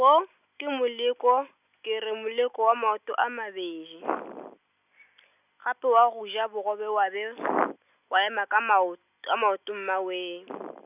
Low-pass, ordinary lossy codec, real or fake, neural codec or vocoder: 3.6 kHz; none; real; none